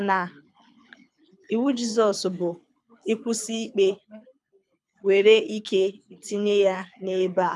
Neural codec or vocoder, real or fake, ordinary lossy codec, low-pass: codec, 24 kHz, 6 kbps, HILCodec; fake; none; none